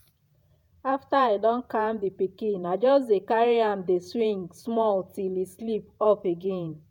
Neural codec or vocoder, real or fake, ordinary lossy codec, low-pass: vocoder, 48 kHz, 128 mel bands, Vocos; fake; none; 19.8 kHz